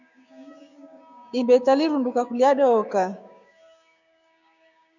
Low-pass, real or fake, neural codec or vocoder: 7.2 kHz; fake; codec, 16 kHz, 6 kbps, DAC